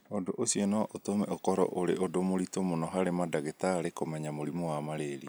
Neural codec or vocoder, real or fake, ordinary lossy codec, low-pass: none; real; none; none